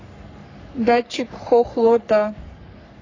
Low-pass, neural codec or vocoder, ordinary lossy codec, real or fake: 7.2 kHz; codec, 44.1 kHz, 3.4 kbps, Pupu-Codec; AAC, 32 kbps; fake